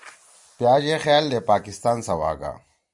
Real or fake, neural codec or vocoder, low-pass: real; none; 10.8 kHz